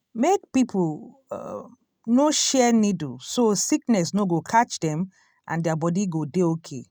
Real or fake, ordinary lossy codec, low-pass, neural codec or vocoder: real; none; none; none